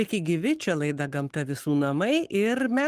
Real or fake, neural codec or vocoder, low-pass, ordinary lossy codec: fake; codec, 44.1 kHz, 7.8 kbps, Pupu-Codec; 14.4 kHz; Opus, 24 kbps